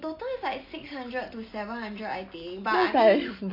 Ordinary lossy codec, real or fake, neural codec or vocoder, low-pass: AAC, 32 kbps; real; none; 5.4 kHz